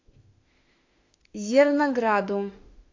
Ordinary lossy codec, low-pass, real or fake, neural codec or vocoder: AAC, 48 kbps; 7.2 kHz; fake; autoencoder, 48 kHz, 32 numbers a frame, DAC-VAE, trained on Japanese speech